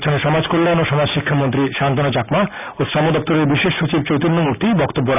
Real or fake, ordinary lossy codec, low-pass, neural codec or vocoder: real; none; 3.6 kHz; none